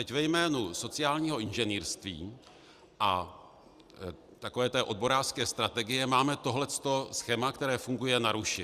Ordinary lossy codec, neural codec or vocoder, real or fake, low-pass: Opus, 64 kbps; none; real; 14.4 kHz